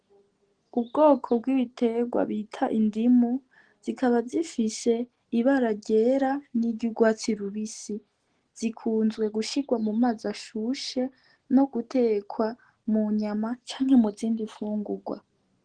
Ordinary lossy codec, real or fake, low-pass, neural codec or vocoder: Opus, 16 kbps; real; 9.9 kHz; none